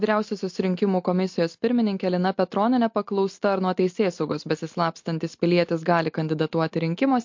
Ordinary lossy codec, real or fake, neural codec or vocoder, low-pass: MP3, 48 kbps; real; none; 7.2 kHz